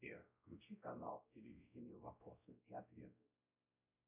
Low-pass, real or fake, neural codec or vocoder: 3.6 kHz; fake; codec, 16 kHz, 0.5 kbps, X-Codec, WavLM features, trained on Multilingual LibriSpeech